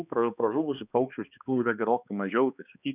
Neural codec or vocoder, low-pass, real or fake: codec, 16 kHz, 2 kbps, X-Codec, HuBERT features, trained on balanced general audio; 3.6 kHz; fake